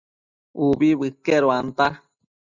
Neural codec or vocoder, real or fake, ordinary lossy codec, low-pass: none; real; Opus, 64 kbps; 7.2 kHz